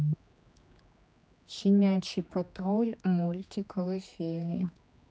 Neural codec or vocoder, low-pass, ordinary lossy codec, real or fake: codec, 16 kHz, 2 kbps, X-Codec, HuBERT features, trained on general audio; none; none; fake